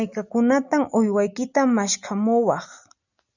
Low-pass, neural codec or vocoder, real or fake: 7.2 kHz; none; real